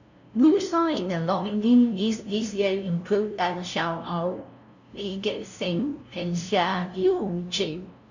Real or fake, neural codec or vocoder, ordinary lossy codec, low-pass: fake; codec, 16 kHz, 0.5 kbps, FunCodec, trained on LibriTTS, 25 frames a second; none; 7.2 kHz